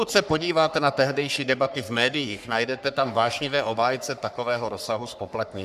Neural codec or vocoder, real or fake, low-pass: codec, 44.1 kHz, 3.4 kbps, Pupu-Codec; fake; 14.4 kHz